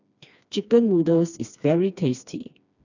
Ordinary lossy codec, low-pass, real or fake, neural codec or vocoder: none; 7.2 kHz; fake; codec, 16 kHz, 2 kbps, FreqCodec, smaller model